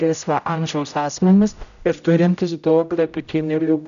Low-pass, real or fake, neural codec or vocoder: 7.2 kHz; fake; codec, 16 kHz, 0.5 kbps, X-Codec, HuBERT features, trained on general audio